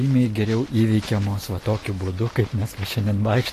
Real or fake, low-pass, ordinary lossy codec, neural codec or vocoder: fake; 14.4 kHz; AAC, 48 kbps; vocoder, 44.1 kHz, 128 mel bands every 256 samples, BigVGAN v2